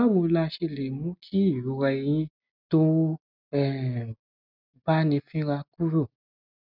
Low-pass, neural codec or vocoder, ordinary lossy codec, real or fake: 5.4 kHz; none; none; real